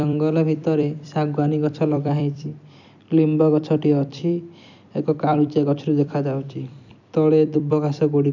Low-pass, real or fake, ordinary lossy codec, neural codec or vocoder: 7.2 kHz; fake; none; vocoder, 44.1 kHz, 128 mel bands every 256 samples, BigVGAN v2